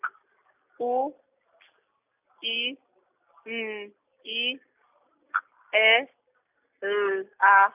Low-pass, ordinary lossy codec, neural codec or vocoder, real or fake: 3.6 kHz; none; none; real